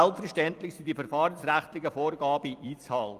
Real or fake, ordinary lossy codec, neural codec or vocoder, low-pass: fake; Opus, 32 kbps; vocoder, 44.1 kHz, 128 mel bands every 256 samples, BigVGAN v2; 14.4 kHz